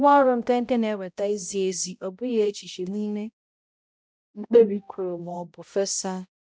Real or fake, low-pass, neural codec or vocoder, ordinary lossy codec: fake; none; codec, 16 kHz, 0.5 kbps, X-Codec, HuBERT features, trained on balanced general audio; none